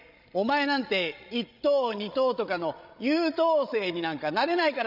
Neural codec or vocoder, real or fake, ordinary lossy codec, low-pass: codec, 16 kHz, 16 kbps, FreqCodec, larger model; fake; none; 5.4 kHz